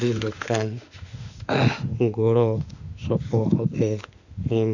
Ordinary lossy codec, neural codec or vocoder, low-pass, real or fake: none; autoencoder, 48 kHz, 32 numbers a frame, DAC-VAE, trained on Japanese speech; 7.2 kHz; fake